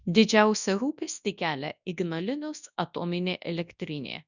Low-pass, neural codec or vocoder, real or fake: 7.2 kHz; codec, 24 kHz, 0.9 kbps, WavTokenizer, large speech release; fake